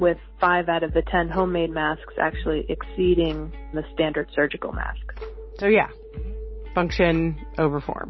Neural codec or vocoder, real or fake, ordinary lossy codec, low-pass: none; real; MP3, 24 kbps; 7.2 kHz